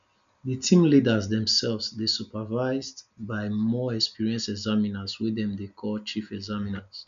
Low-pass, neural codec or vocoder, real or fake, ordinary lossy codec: 7.2 kHz; none; real; none